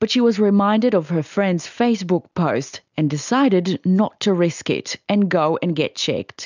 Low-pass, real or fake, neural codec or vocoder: 7.2 kHz; real; none